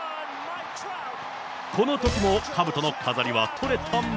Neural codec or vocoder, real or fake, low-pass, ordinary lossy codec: none; real; none; none